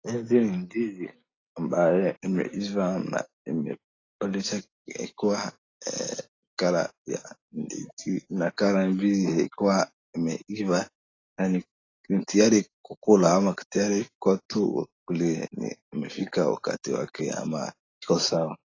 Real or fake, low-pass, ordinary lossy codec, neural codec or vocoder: real; 7.2 kHz; AAC, 32 kbps; none